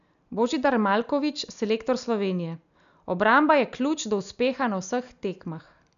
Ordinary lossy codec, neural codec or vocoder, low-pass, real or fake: none; none; 7.2 kHz; real